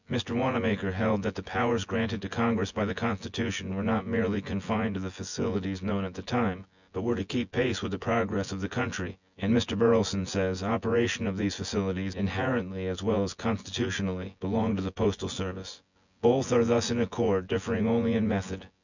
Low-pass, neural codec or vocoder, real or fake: 7.2 kHz; vocoder, 24 kHz, 100 mel bands, Vocos; fake